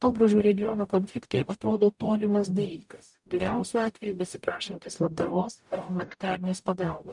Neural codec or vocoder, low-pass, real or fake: codec, 44.1 kHz, 0.9 kbps, DAC; 10.8 kHz; fake